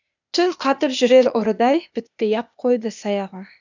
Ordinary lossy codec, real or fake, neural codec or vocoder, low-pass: none; fake; codec, 16 kHz, 0.8 kbps, ZipCodec; 7.2 kHz